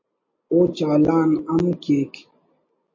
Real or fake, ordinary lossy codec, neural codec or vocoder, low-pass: real; MP3, 32 kbps; none; 7.2 kHz